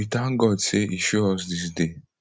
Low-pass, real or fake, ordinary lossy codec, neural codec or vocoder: none; real; none; none